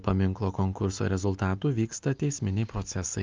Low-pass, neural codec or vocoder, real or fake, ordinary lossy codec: 7.2 kHz; none; real; Opus, 32 kbps